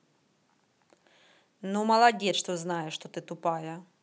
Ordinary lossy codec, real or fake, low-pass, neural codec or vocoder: none; real; none; none